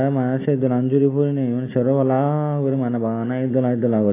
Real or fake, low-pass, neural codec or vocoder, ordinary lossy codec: real; 3.6 kHz; none; AAC, 16 kbps